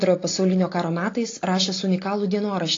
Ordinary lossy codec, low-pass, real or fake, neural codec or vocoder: AAC, 32 kbps; 7.2 kHz; real; none